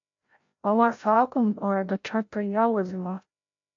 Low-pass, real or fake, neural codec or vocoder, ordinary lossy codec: 7.2 kHz; fake; codec, 16 kHz, 0.5 kbps, FreqCodec, larger model; AAC, 48 kbps